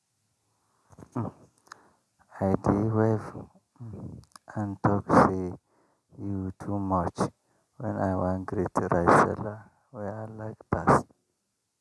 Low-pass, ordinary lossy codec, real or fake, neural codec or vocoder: none; none; real; none